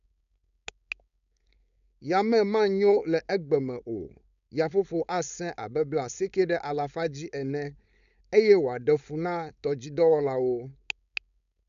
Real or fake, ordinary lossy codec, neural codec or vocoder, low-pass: fake; none; codec, 16 kHz, 4.8 kbps, FACodec; 7.2 kHz